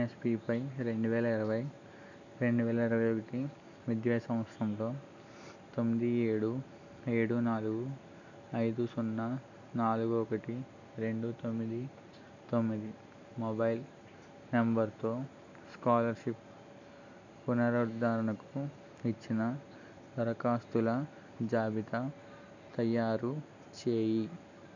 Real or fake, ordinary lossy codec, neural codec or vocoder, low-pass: real; none; none; 7.2 kHz